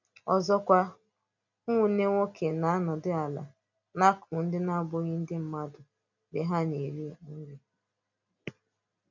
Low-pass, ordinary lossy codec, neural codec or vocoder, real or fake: 7.2 kHz; none; none; real